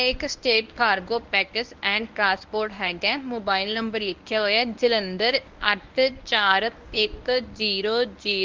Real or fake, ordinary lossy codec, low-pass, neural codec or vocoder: fake; Opus, 32 kbps; 7.2 kHz; codec, 24 kHz, 0.9 kbps, WavTokenizer, medium speech release version 1